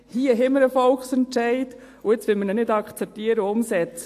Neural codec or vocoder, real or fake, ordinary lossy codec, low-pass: none; real; AAC, 64 kbps; 14.4 kHz